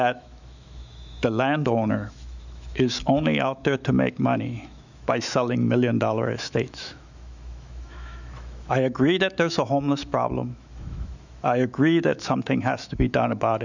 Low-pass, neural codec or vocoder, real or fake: 7.2 kHz; autoencoder, 48 kHz, 128 numbers a frame, DAC-VAE, trained on Japanese speech; fake